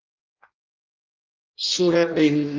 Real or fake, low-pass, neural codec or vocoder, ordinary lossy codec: fake; 7.2 kHz; codec, 16 kHz in and 24 kHz out, 0.6 kbps, FireRedTTS-2 codec; Opus, 24 kbps